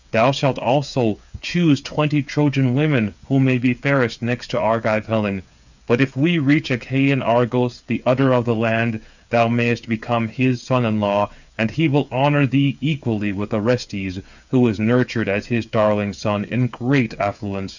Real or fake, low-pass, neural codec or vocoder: fake; 7.2 kHz; codec, 16 kHz, 8 kbps, FreqCodec, smaller model